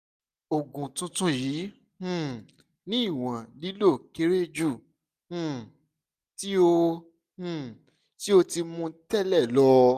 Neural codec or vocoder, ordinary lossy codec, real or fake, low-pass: none; none; real; 14.4 kHz